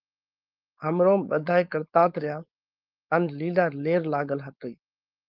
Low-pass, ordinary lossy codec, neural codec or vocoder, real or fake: 5.4 kHz; Opus, 32 kbps; codec, 16 kHz, 4.8 kbps, FACodec; fake